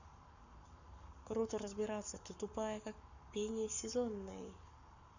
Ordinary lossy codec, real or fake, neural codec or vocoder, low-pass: none; fake; codec, 44.1 kHz, 7.8 kbps, Pupu-Codec; 7.2 kHz